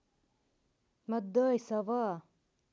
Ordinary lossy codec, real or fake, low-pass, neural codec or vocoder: none; real; none; none